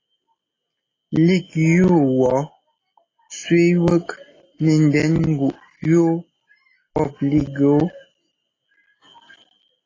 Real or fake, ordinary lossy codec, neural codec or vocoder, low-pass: real; AAC, 32 kbps; none; 7.2 kHz